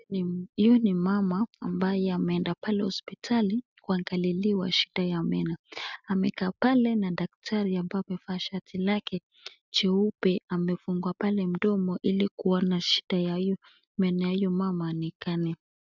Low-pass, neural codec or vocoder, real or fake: 7.2 kHz; none; real